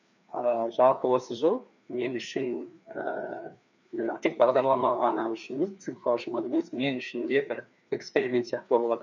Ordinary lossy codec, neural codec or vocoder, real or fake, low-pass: none; codec, 16 kHz, 2 kbps, FreqCodec, larger model; fake; 7.2 kHz